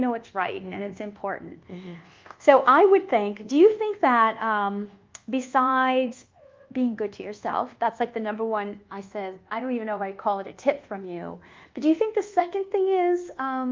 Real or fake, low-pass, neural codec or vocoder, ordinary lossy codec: fake; 7.2 kHz; codec, 24 kHz, 1.2 kbps, DualCodec; Opus, 32 kbps